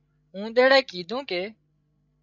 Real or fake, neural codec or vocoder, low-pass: fake; codec, 16 kHz, 16 kbps, FreqCodec, larger model; 7.2 kHz